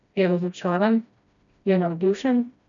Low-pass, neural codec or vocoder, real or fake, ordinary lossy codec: 7.2 kHz; codec, 16 kHz, 1 kbps, FreqCodec, smaller model; fake; none